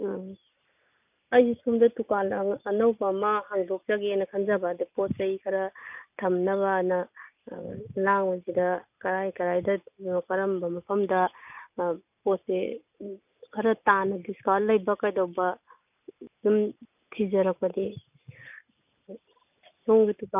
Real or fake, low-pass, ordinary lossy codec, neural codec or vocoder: real; 3.6 kHz; none; none